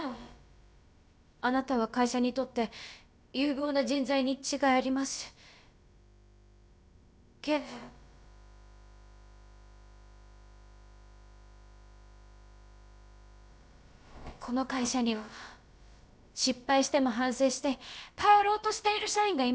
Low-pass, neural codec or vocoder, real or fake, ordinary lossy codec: none; codec, 16 kHz, about 1 kbps, DyCAST, with the encoder's durations; fake; none